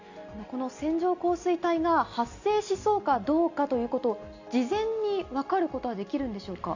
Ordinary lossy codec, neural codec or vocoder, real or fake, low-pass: Opus, 64 kbps; none; real; 7.2 kHz